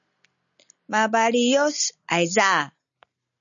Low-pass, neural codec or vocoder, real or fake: 7.2 kHz; none; real